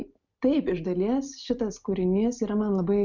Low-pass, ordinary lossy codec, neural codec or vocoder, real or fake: 7.2 kHz; MP3, 64 kbps; none; real